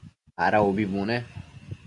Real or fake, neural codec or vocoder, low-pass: real; none; 10.8 kHz